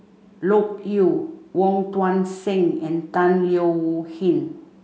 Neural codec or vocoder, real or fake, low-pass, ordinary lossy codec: none; real; none; none